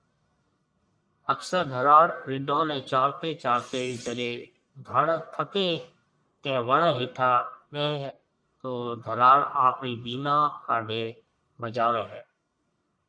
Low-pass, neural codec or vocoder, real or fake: 9.9 kHz; codec, 44.1 kHz, 1.7 kbps, Pupu-Codec; fake